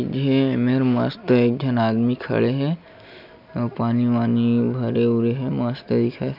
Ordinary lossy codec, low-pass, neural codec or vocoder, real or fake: none; 5.4 kHz; none; real